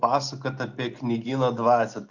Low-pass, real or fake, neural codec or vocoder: 7.2 kHz; real; none